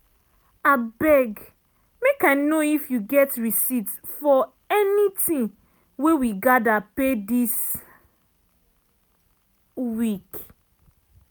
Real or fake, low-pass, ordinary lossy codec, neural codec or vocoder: real; none; none; none